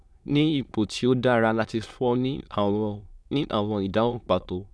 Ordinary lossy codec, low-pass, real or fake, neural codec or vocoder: none; none; fake; autoencoder, 22.05 kHz, a latent of 192 numbers a frame, VITS, trained on many speakers